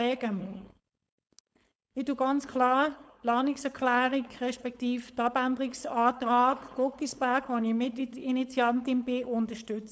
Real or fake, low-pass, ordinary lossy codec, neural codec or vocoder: fake; none; none; codec, 16 kHz, 4.8 kbps, FACodec